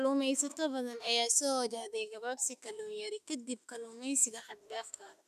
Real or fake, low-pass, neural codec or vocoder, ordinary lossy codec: fake; 14.4 kHz; autoencoder, 48 kHz, 32 numbers a frame, DAC-VAE, trained on Japanese speech; none